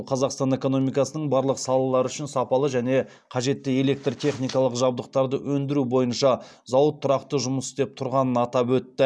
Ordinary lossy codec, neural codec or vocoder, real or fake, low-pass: none; none; real; none